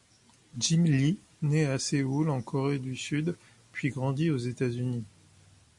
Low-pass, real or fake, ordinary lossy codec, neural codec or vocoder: 10.8 kHz; real; MP3, 48 kbps; none